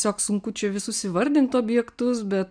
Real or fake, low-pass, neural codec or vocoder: real; 9.9 kHz; none